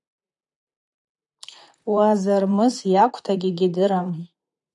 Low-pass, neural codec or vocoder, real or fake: 10.8 kHz; vocoder, 44.1 kHz, 128 mel bands, Pupu-Vocoder; fake